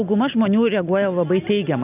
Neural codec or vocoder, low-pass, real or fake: none; 3.6 kHz; real